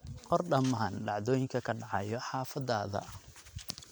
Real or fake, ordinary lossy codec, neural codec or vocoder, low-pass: real; none; none; none